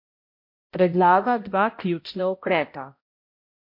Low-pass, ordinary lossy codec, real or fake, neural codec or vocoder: 5.4 kHz; MP3, 32 kbps; fake; codec, 16 kHz, 0.5 kbps, X-Codec, HuBERT features, trained on balanced general audio